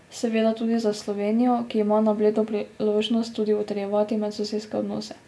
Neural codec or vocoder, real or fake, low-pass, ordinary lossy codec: none; real; none; none